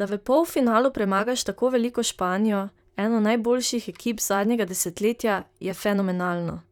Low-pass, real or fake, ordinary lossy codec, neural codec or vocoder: 19.8 kHz; fake; none; vocoder, 44.1 kHz, 128 mel bands, Pupu-Vocoder